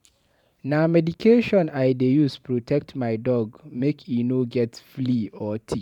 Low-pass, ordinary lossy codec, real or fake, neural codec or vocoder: 19.8 kHz; none; fake; vocoder, 44.1 kHz, 128 mel bands every 512 samples, BigVGAN v2